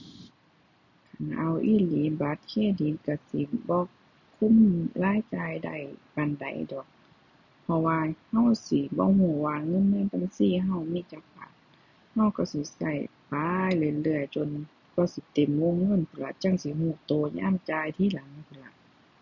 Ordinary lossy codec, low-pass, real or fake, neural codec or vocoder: none; 7.2 kHz; real; none